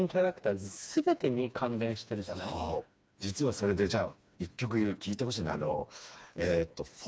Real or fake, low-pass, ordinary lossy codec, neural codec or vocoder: fake; none; none; codec, 16 kHz, 2 kbps, FreqCodec, smaller model